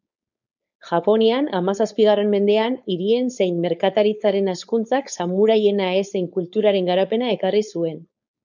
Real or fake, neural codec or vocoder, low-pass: fake; codec, 16 kHz, 4.8 kbps, FACodec; 7.2 kHz